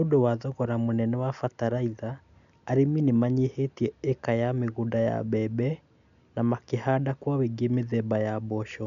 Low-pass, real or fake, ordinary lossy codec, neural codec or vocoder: 7.2 kHz; real; none; none